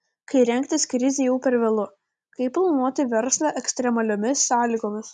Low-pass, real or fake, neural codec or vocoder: 10.8 kHz; real; none